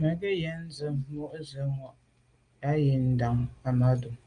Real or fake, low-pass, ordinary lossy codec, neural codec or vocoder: real; 9.9 kHz; Opus, 32 kbps; none